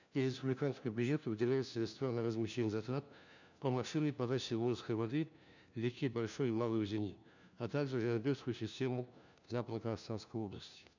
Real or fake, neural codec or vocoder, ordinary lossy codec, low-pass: fake; codec, 16 kHz, 1 kbps, FunCodec, trained on LibriTTS, 50 frames a second; none; 7.2 kHz